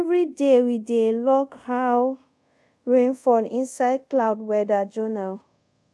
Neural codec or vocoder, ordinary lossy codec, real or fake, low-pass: codec, 24 kHz, 0.5 kbps, DualCodec; none; fake; none